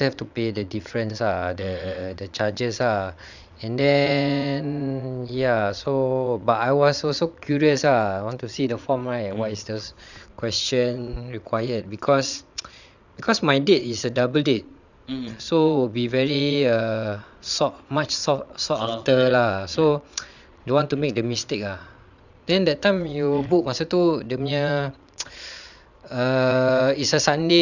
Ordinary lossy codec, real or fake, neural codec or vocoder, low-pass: none; fake; vocoder, 22.05 kHz, 80 mel bands, Vocos; 7.2 kHz